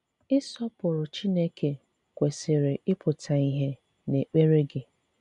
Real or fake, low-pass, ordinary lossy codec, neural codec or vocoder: real; 10.8 kHz; none; none